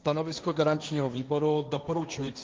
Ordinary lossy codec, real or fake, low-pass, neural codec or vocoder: Opus, 24 kbps; fake; 7.2 kHz; codec, 16 kHz, 1.1 kbps, Voila-Tokenizer